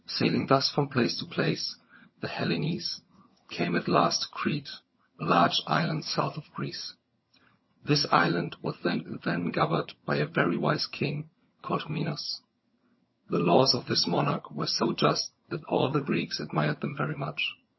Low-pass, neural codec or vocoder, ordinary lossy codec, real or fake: 7.2 kHz; vocoder, 22.05 kHz, 80 mel bands, HiFi-GAN; MP3, 24 kbps; fake